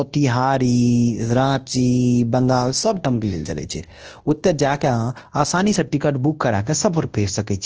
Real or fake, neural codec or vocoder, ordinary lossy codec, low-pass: fake; codec, 24 kHz, 0.9 kbps, WavTokenizer, large speech release; Opus, 16 kbps; 7.2 kHz